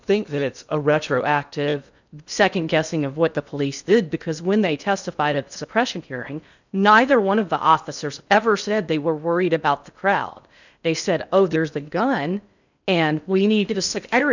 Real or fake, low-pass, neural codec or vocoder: fake; 7.2 kHz; codec, 16 kHz in and 24 kHz out, 0.6 kbps, FocalCodec, streaming, 2048 codes